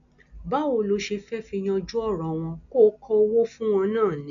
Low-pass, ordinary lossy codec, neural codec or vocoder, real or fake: 7.2 kHz; none; none; real